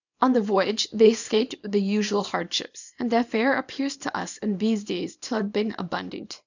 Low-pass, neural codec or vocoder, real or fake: 7.2 kHz; codec, 24 kHz, 0.9 kbps, WavTokenizer, small release; fake